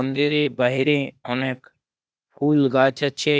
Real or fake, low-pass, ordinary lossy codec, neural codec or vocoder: fake; none; none; codec, 16 kHz, 0.8 kbps, ZipCodec